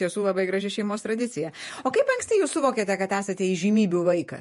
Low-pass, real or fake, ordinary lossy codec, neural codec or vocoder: 14.4 kHz; fake; MP3, 48 kbps; vocoder, 48 kHz, 128 mel bands, Vocos